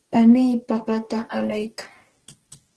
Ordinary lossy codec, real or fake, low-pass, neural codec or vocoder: Opus, 16 kbps; fake; 10.8 kHz; codec, 44.1 kHz, 2.6 kbps, DAC